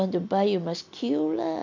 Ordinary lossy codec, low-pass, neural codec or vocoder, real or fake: MP3, 64 kbps; 7.2 kHz; none; real